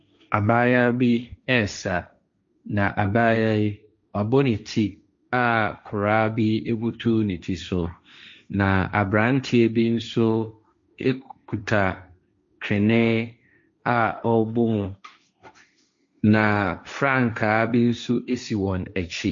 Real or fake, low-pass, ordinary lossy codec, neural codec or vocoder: fake; 7.2 kHz; MP3, 48 kbps; codec, 16 kHz, 1.1 kbps, Voila-Tokenizer